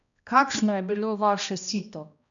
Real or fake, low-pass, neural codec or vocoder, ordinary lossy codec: fake; 7.2 kHz; codec, 16 kHz, 1 kbps, X-Codec, HuBERT features, trained on balanced general audio; none